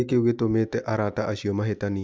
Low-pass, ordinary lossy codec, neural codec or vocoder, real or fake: none; none; none; real